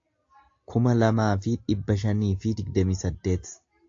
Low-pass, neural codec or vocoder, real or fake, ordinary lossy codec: 7.2 kHz; none; real; AAC, 48 kbps